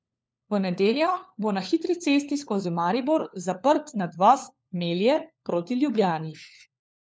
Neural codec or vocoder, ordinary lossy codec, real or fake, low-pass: codec, 16 kHz, 4 kbps, FunCodec, trained on LibriTTS, 50 frames a second; none; fake; none